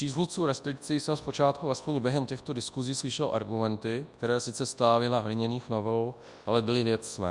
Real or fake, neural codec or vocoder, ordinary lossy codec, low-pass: fake; codec, 24 kHz, 0.9 kbps, WavTokenizer, large speech release; Opus, 64 kbps; 10.8 kHz